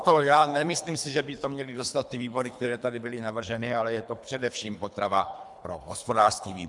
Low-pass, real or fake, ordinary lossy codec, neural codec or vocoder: 10.8 kHz; fake; MP3, 96 kbps; codec, 24 kHz, 3 kbps, HILCodec